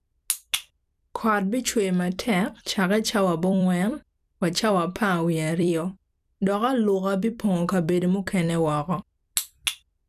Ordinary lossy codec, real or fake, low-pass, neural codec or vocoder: none; fake; 14.4 kHz; vocoder, 44.1 kHz, 128 mel bands every 256 samples, BigVGAN v2